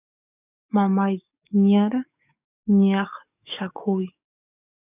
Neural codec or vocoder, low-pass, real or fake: codec, 44.1 kHz, 7.8 kbps, DAC; 3.6 kHz; fake